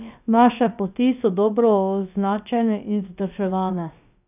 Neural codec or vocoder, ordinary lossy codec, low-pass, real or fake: codec, 16 kHz, about 1 kbps, DyCAST, with the encoder's durations; none; 3.6 kHz; fake